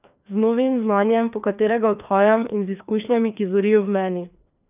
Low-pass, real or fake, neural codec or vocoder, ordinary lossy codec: 3.6 kHz; fake; codec, 16 kHz, 2 kbps, FreqCodec, larger model; none